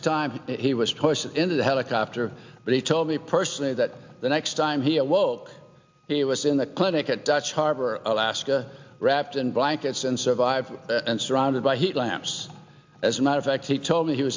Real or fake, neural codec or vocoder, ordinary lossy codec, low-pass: real; none; MP3, 64 kbps; 7.2 kHz